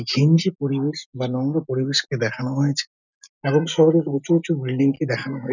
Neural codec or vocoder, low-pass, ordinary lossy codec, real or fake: none; 7.2 kHz; none; real